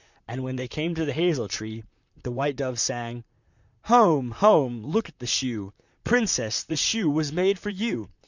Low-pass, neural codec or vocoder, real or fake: 7.2 kHz; vocoder, 44.1 kHz, 128 mel bands, Pupu-Vocoder; fake